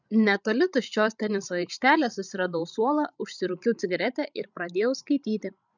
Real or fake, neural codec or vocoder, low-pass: fake; codec, 16 kHz, 16 kbps, FreqCodec, larger model; 7.2 kHz